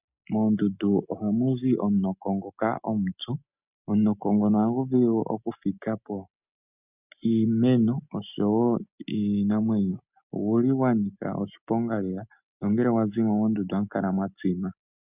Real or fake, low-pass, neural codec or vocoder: real; 3.6 kHz; none